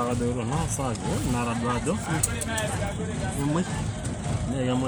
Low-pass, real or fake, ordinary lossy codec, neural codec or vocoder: none; real; none; none